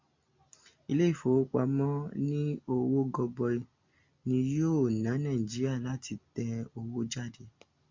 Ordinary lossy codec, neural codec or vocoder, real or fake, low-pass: MP3, 64 kbps; none; real; 7.2 kHz